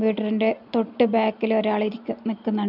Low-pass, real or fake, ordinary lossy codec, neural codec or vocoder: 5.4 kHz; real; none; none